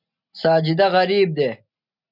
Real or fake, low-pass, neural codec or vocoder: real; 5.4 kHz; none